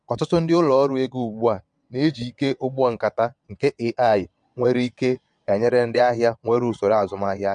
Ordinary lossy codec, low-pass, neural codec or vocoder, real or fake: MP3, 64 kbps; 9.9 kHz; vocoder, 22.05 kHz, 80 mel bands, WaveNeXt; fake